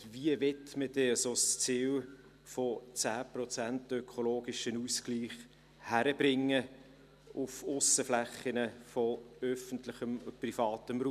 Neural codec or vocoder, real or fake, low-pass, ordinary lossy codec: none; real; 14.4 kHz; none